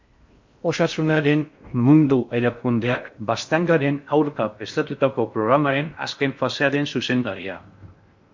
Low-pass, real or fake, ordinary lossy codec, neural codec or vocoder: 7.2 kHz; fake; MP3, 48 kbps; codec, 16 kHz in and 24 kHz out, 0.6 kbps, FocalCodec, streaming, 4096 codes